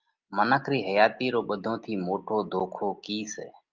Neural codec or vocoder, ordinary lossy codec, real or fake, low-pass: none; Opus, 24 kbps; real; 7.2 kHz